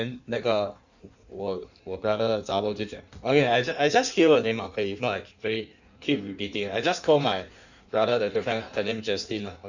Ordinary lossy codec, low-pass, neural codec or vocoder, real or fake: none; 7.2 kHz; codec, 16 kHz in and 24 kHz out, 1.1 kbps, FireRedTTS-2 codec; fake